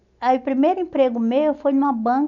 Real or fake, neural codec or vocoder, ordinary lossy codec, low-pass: real; none; none; 7.2 kHz